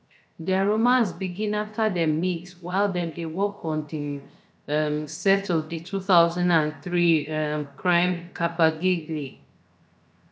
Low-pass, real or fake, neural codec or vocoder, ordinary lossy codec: none; fake; codec, 16 kHz, 0.7 kbps, FocalCodec; none